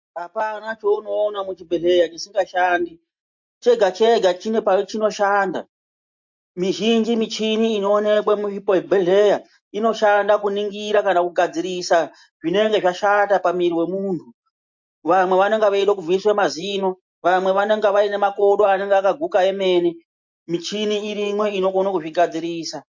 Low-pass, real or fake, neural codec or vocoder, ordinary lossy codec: 7.2 kHz; real; none; MP3, 48 kbps